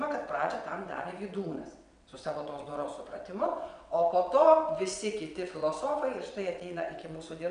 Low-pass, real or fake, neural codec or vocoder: 9.9 kHz; fake; vocoder, 22.05 kHz, 80 mel bands, WaveNeXt